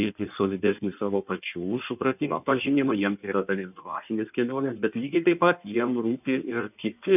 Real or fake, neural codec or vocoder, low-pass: fake; codec, 16 kHz in and 24 kHz out, 1.1 kbps, FireRedTTS-2 codec; 3.6 kHz